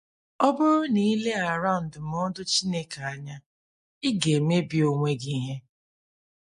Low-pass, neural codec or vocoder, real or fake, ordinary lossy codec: 14.4 kHz; none; real; MP3, 48 kbps